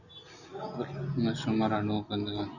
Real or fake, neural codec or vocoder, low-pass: real; none; 7.2 kHz